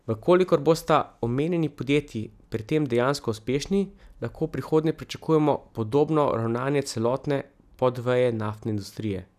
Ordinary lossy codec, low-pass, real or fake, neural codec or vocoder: none; 14.4 kHz; real; none